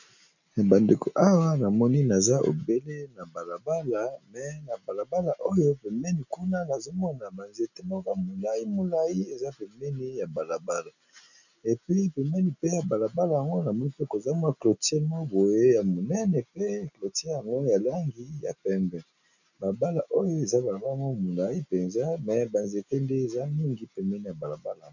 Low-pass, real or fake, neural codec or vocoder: 7.2 kHz; real; none